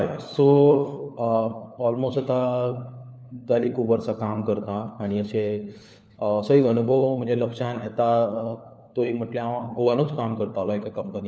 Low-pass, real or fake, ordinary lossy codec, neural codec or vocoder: none; fake; none; codec, 16 kHz, 4 kbps, FunCodec, trained on LibriTTS, 50 frames a second